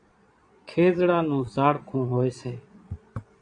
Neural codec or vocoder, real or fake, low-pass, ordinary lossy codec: vocoder, 22.05 kHz, 80 mel bands, Vocos; fake; 9.9 kHz; AAC, 64 kbps